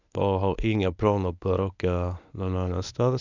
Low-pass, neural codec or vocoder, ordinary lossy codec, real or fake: 7.2 kHz; codec, 24 kHz, 0.9 kbps, WavTokenizer, medium speech release version 1; none; fake